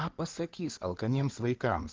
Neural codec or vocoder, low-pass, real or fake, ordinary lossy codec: codec, 16 kHz in and 24 kHz out, 2.2 kbps, FireRedTTS-2 codec; 7.2 kHz; fake; Opus, 16 kbps